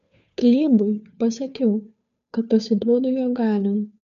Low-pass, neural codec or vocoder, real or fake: 7.2 kHz; codec, 16 kHz, 2 kbps, FunCodec, trained on Chinese and English, 25 frames a second; fake